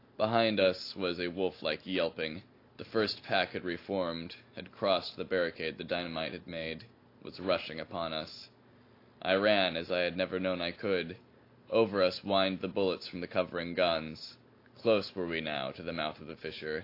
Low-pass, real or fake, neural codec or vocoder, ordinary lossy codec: 5.4 kHz; real; none; AAC, 32 kbps